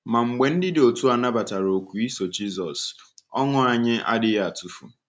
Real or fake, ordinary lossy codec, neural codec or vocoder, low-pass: real; none; none; none